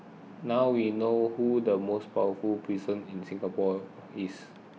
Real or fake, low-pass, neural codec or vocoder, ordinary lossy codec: real; none; none; none